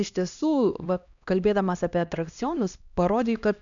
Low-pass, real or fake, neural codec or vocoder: 7.2 kHz; fake; codec, 16 kHz, 1 kbps, X-Codec, HuBERT features, trained on LibriSpeech